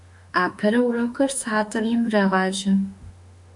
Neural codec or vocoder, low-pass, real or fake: autoencoder, 48 kHz, 32 numbers a frame, DAC-VAE, trained on Japanese speech; 10.8 kHz; fake